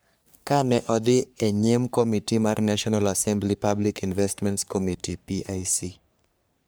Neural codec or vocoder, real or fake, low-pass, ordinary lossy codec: codec, 44.1 kHz, 3.4 kbps, Pupu-Codec; fake; none; none